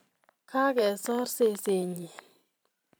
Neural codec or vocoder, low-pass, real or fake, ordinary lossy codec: vocoder, 44.1 kHz, 128 mel bands every 512 samples, BigVGAN v2; none; fake; none